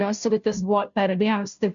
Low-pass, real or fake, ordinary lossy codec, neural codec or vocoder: 7.2 kHz; fake; MP3, 64 kbps; codec, 16 kHz, 0.5 kbps, FunCodec, trained on Chinese and English, 25 frames a second